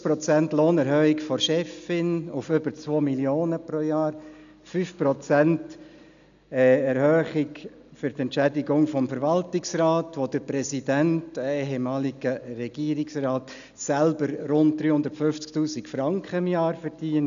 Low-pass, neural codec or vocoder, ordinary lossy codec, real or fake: 7.2 kHz; none; none; real